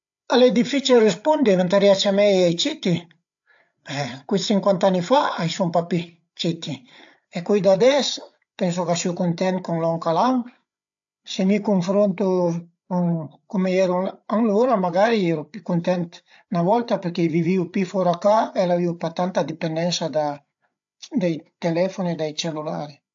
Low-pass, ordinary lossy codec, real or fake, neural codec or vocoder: 7.2 kHz; AAC, 64 kbps; fake; codec, 16 kHz, 8 kbps, FreqCodec, larger model